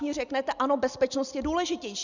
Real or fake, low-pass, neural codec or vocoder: real; 7.2 kHz; none